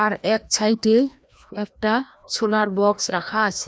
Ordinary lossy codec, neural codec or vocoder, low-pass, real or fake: none; codec, 16 kHz, 1 kbps, FreqCodec, larger model; none; fake